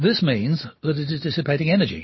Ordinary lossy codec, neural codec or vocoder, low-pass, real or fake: MP3, 24 kbps; none; 7.2 kHz; real